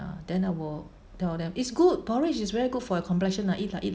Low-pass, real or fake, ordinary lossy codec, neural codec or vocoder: none; real; none; none